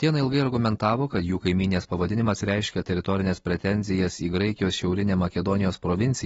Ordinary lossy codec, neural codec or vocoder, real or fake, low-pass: AAC, 24 kbps; none; real; 9.9 kHz